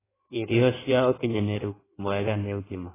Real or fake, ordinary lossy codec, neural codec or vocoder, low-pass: fake; AAC, 16 kbps; codec, 16 kHz in and 24 kHz out, 1.1 kbps, FireRedTTS-2 codec; 3.6 kHz